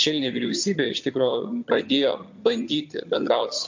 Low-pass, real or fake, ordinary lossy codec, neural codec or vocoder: 7.2 kHz; fake; MP3, 48 kbps; vocoder, 22.05 kHz, 80 mel bands, HiFi-GAN